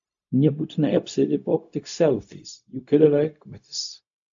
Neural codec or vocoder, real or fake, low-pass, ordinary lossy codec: codec, 16 kHz, 0.4 kbps, LongCat-Audio-Codec; fake; 7.2 kHz; AAC, 64 kbps